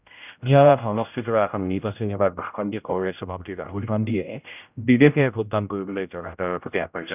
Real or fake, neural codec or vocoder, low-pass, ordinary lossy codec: fake; codec, 16 kHz, 0.5 kbps, X-Codec, HuBERT features, trained on general audio; 3.6 kHz; none